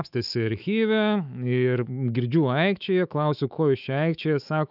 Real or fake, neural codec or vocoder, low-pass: fake; autoencoder, 48 kHz, 128 numbers a frame, DAC-VAE, trained on Japanese speech; 5.4 kHz